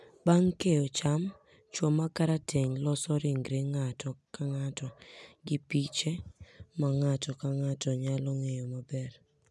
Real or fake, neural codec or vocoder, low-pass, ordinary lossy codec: real; none; none; none